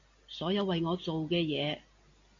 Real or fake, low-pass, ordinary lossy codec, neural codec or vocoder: real; 7.2 kHz; AAC, 64 kbps; none